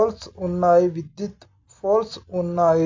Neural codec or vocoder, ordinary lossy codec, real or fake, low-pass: none; MP3, 64 kbps; real; 7.2 kHz